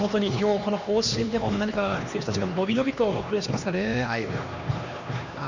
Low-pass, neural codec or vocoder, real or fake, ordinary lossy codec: 7.2 kHz; codec, 16 kHz, 2 kbps, X-Codec, HuBERT features, trained on LibriSpeech; fake; none